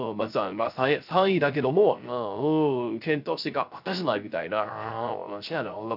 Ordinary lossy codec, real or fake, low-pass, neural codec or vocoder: none; fake; 5.4 kHz; codec, 16 kHz, 0.3 kbps, FocalCodec